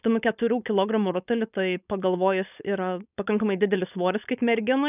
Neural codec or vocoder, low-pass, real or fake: codec, 16 kHz, 4.8 kbps, FACodec; 3.6 kHz; fake